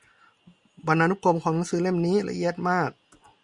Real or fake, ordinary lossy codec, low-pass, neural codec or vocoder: real; Opus, 64 kbps; 10.8 kHz; none